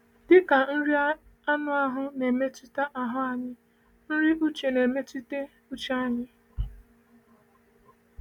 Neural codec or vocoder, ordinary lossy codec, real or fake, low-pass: none; MP3, 96 kbps; real; 19.8 kHz